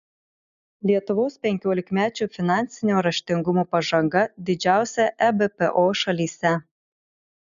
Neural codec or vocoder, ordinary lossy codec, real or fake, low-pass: none; MP3, 96 kbps; real; 7.2 kHz